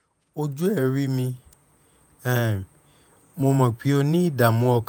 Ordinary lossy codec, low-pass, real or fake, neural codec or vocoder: none; none; fake; vocoder, 48 kHz, 128 mel bands, Vocos